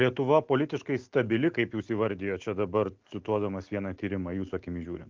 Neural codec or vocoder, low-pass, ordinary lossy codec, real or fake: none; 7.2 kHz; Opus, 32 kbps; real